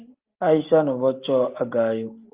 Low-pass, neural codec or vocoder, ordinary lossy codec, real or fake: 3.6 kHz; none; Opus, 32 kbps; real